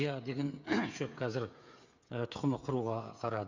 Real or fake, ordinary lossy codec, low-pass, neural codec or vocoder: fake; Opus, 64 kbps; 7.2 kHz; codec, 16 kHz, 8 kbps, FreqCodec, smaller model